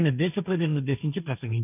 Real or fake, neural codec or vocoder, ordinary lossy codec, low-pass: fake; codec, 16 kHz, 1.1 kbps, Voila-Tokenizer; none; 3.6 kHz